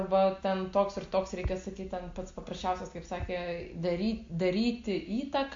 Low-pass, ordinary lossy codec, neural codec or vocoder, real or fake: 7.2 kHz; MP3, 48 kbps; none; real